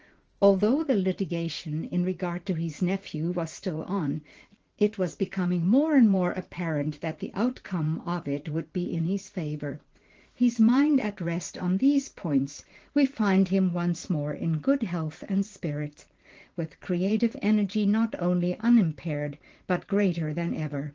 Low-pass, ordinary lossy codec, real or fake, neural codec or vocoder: 7.2 kHz; Opus, 16 kbps; fake; vocoder, 44.1 kHz, 80 mel bands, Vocos